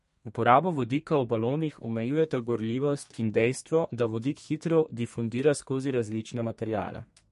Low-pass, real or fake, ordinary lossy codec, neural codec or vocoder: 14.4 kHz; fake; MP3, 48 kbps; codec, 32 kHz, 1.9 kbps, SNAC